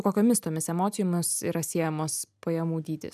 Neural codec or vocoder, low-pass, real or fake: none; 14.4 kHz; real